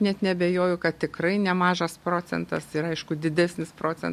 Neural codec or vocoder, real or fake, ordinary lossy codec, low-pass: none; real; MP3, 64 kbps; 14.4 kHz